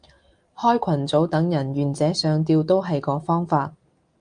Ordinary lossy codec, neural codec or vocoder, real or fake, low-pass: Opus, 32 kbps; none; real; 9.9 kHz